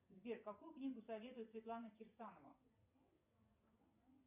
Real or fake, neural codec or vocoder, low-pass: fake; vocoder, 22.05 kHz, 80 mel bands, WaveNeXt; 3.6 kHz